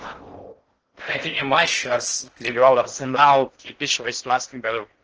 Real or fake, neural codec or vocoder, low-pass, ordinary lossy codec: fake; codec, 16 kHz in and 24 kHz out, 0.6 kbps, FocalCodec, streaming, 4096 codes; 7.2 kHz; Opus, 16 kbps